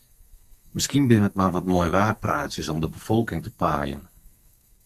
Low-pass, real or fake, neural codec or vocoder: 14.4 kHz; fake; codec, 44.1 kHz, 2.6 kbps, SNAC